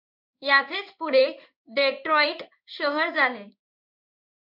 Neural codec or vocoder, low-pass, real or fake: codec, 16 kHz in and 24 kHz out, 1 kbps, XY-Tokenizer; 5.4 kHz; fake